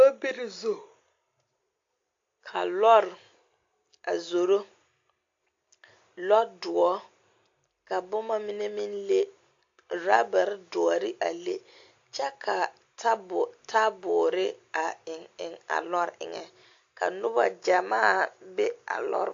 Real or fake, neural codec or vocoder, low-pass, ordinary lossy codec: real; none; 7.2 kHz; AAC, 48 kbps